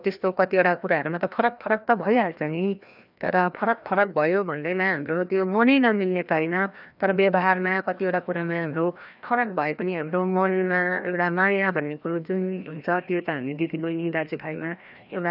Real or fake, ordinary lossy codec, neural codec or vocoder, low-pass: fake; none; codec, 16 kHz, 1 kbps, FreqCodec, larger model; 5.4 kHz